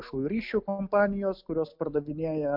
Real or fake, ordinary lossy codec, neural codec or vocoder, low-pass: real; MP3, 48 kbps; none; 5.4 kHz